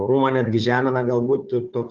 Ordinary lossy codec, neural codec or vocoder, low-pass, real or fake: Opus, 32 kbps; codec, 16 kHz, 4 kbps, FunCodec, trained on Chinese and English, 50 frames a second; 7.2 kHz; fake